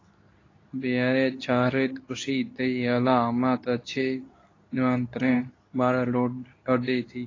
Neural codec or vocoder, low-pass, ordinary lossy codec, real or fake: codec, 24 kHz, 0.9 kbps, WavTokenizer, medium speech release version 2; 7.2 kHz; AAC, 32 kbps; fake